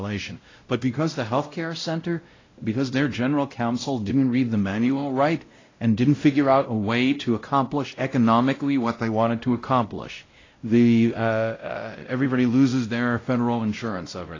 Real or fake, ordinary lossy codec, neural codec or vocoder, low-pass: fake; AAC, 32 kbps; codec, 16 kHz, 0.5 kbps, X-Codec, WavLM features, trained on Multilingual LibriSpeech; 7.2 kHz